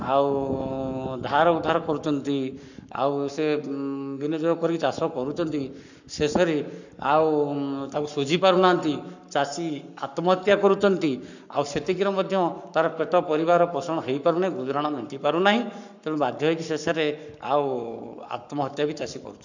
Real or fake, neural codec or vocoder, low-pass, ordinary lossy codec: fake; codec, 44.1 kHz, 7.8 kbps, Pupu-Codec; 7.2 kHz; none